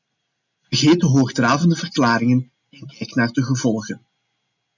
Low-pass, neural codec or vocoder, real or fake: 7.2 kHz; none; real